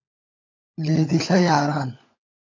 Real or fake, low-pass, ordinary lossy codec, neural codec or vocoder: fake; 7.2 kHz; AAC, 32 kbps; codec, 16 kHz, 16 kbps, FunCodec, trained on LibriTTS, 50 frames a second